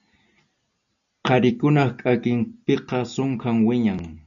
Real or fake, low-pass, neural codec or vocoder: real; 7.2 kHz; none